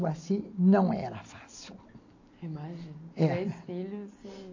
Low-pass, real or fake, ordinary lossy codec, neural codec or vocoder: 7.2 kHz; real; AAC, 48 kbps; none